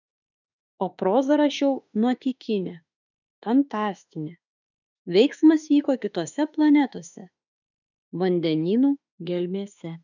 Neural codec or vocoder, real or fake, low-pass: autoencoder, 48 kHz, 32 numbers a frame, DAC-VAE, trained on Japanese speech; fake; 7.2 kHz